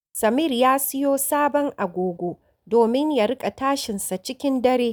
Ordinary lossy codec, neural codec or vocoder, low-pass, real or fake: none; none; none; real